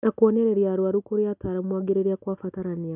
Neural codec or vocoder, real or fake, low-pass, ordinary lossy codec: none; real; 3.6 kHz; none